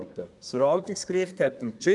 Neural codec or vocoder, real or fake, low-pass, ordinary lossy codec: codec, 24 kHz, 1 kbps, SNAC; fake; 10.8 kHz; none